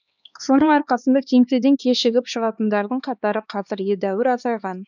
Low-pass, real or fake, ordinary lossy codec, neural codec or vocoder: 7.2 kHz; fake; none; codec, 16 kHz, 2 kbps, X-Codec, HuBERT features, trained on LibriSpeech